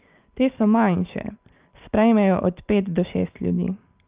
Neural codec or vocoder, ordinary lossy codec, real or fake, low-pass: none; Opus, 32 kbps; real; 3.6 kHz